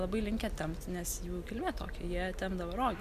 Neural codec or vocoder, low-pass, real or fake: none; 14.4 kHz; real